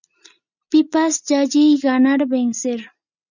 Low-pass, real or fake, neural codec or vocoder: 7.2 kHz; real; none